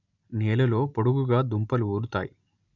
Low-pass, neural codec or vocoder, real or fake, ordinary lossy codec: 7.2 kHz; none; real; none